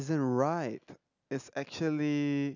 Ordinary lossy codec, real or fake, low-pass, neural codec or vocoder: none; real; 7.2 kHz; none